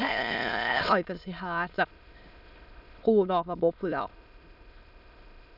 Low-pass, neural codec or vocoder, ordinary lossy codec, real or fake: 5.4 kHz; autoencoder, 22.05 kHz, a latent of 192 numbers a frame, VITS, trained on many speakers; none; fake